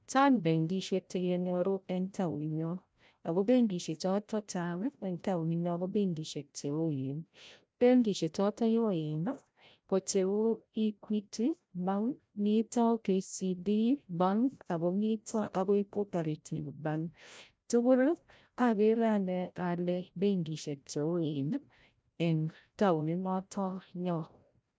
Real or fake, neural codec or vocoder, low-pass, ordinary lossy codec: fake; codec, 16 kHz, 0.5 kbps, FreqCodec, larger model; none; none